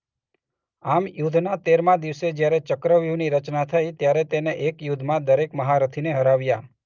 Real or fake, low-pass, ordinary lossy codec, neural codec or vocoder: real; 7.2 kHz; Opus, 24 kbps; none